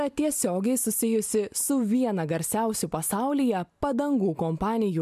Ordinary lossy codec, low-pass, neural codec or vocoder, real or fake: MP3, 96 kbps; 14.4 kHz; none; real